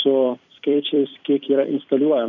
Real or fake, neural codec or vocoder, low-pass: fake; vocoder, 44.1 kHz, 128 mel bands every 256 samples, BigVGAN v2; 7.2 kHz